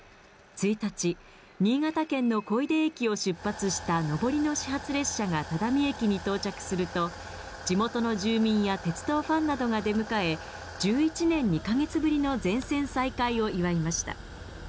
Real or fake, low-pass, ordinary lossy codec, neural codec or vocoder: real; none; none; none